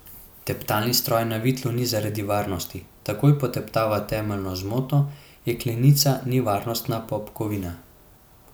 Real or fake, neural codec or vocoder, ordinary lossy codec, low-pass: real; none; none; none